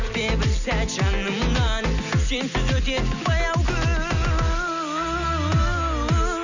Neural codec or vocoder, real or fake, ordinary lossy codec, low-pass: none; real; none; 7.2 kHz